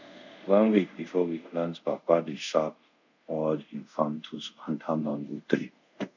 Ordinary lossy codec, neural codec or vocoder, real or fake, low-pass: none; codec, 24 kHz, 0.5 kbps, DualCodec; fake; 7.2 kHz